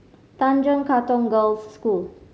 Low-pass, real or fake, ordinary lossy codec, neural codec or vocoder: none; real; none; none